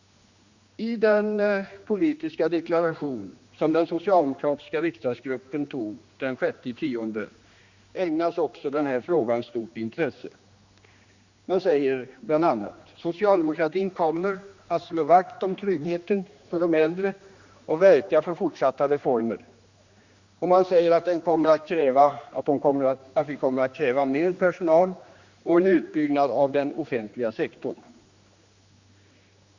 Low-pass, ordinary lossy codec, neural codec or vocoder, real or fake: 7.2 kHz; Opus, 64 kbps; codec, 16 kHz, 2 kbps, X-Codec, HuBERT features, trained on general audio; fake